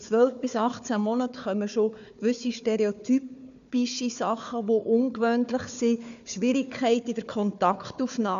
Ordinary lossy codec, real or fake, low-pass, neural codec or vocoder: none; fake; 7.2 kHz; codec, 16 kHz, 4 kbps, FunCodec, trained on Chinese and English, 50 frames a second